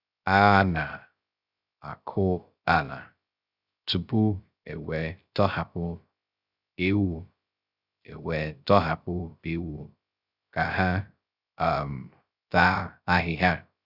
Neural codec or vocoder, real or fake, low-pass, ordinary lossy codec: codec, 16 kHz, 0.3 kbps, FocalCodec; fake; 5.4 kHz; Opus, 64 kbps